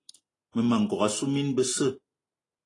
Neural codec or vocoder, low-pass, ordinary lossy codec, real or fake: none; 10.8 kHz; AAC, 32 kbps; real